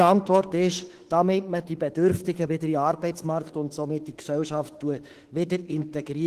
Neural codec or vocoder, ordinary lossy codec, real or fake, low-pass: autoencoder, 48 kHz, 32 numbers a frame, DAC-VAE, trained on Japanese speech; Opus, 16 kbps; fake; 14.4 kHz